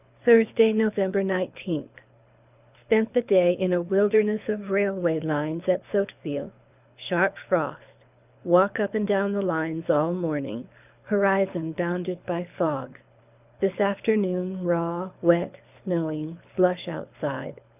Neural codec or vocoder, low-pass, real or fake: codec, 24 kHz, 6 kbps, HILCodec; 3.6 kHz; fake